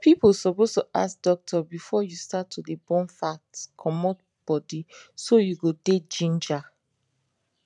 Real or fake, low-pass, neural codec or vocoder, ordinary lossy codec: fake; 10.8 kHz; vocoder, 44.1 kHz, 128 mel bands every 512 samples, BigVGAN v2; none